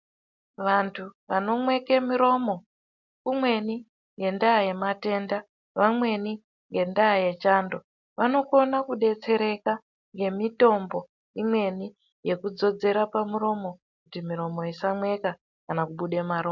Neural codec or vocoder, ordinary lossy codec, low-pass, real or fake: none; MP3, 64 kbps; 7.2 kHz; real